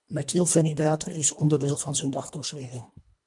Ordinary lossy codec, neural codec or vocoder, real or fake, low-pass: MP3, 96 kbps; codec, 24 kHz, 1.5 kbps, HILCodec; fake; 10.8 kHz